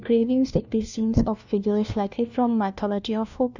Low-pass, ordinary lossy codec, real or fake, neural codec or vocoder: 7.2 kHz; none; fake; codec, 16 kHz, 1 kbps, FunCodec, trained on LibriTTS, 50 frames a second